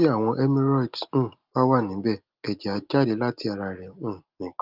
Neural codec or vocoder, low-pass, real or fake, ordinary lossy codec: none; 5.4 kHz; real; Opus, 32 kbps